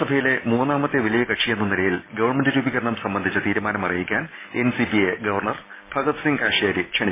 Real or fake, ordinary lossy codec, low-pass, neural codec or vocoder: real; MP3, 16 kbps; 3.6 kHz; none